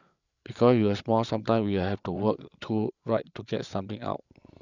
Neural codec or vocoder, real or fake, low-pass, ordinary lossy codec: codec, 16 kHz, 8 kbps, FreqCodec, larger model; fake; 7.2 kHz; none